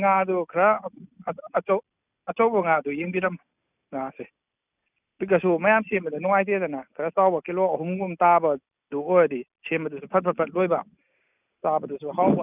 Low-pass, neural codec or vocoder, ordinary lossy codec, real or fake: 3.6 kHz; none; none; real